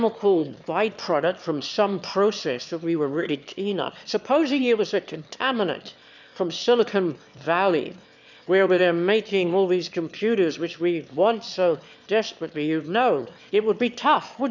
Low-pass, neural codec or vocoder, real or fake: 7.2 kHz; autoencoder, 22.05 kHz, a latent of 192 numbers a frame, VITS, trained on one speaker; fake